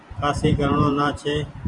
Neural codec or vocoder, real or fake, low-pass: vocoder, 44.1 kHz, 128 mel bands every 512 samples, BigVGAN v2; fake; 10.8 kHz